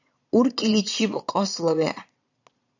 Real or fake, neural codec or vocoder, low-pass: fake; vocoder, 22.05 kHz, 80 mel bands, Vocos; 7.2 kHz